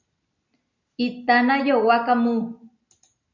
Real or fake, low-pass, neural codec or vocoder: real; 7.2 kHz; none